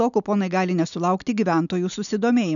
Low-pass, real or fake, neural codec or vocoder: 7.2 kHz; real; none